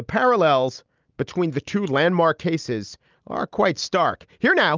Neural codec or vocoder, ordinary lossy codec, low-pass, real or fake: none; Opus, 24 kbps; 7.2 kHz; real